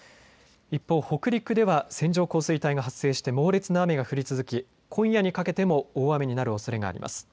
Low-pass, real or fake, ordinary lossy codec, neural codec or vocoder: none; real; none; none